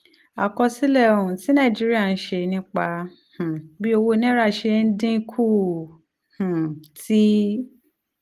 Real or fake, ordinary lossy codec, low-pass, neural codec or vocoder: real; Opus, 24 kbps; 14.4 kHz; none